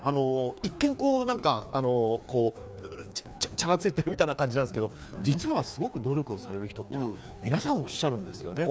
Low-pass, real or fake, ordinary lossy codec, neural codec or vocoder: none; fake; none; codec, 16 kHz, 2 kbps, FreqCodec, larger model